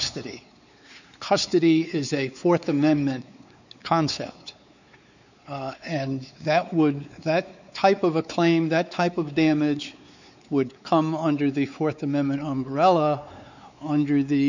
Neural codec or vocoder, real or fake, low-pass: codec, 16 kHz, 4 kbps, X-Codec, WavLM features, trained on Multilingual LibriSpeech; fake; 7.2 kHz